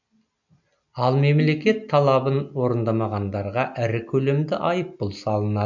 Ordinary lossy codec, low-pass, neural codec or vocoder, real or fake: none; 7.2 kHz; none; real